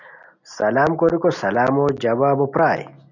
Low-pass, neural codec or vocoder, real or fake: 7.2 kHz; none; real